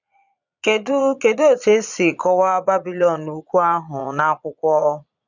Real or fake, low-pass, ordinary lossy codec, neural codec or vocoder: fake; 7.2 kHz; none; vocoder, 22.05 kHz, 80 mel bands, Vocos